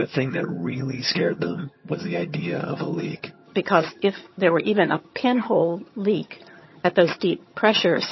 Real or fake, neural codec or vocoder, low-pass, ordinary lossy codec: fake; vocoder, 22.05 kHz, 80 mel bands, HiFi-GAN; 7.2 kHz; MP3, 24 kbps